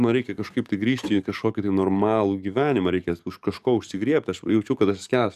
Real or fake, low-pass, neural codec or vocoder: fake; 14.4 kHz; codec, 44.1 kHz, 7.8 kbps, DAC